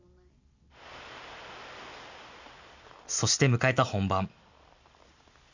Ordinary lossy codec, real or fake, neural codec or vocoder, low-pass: none; real; none; 7.2 kHz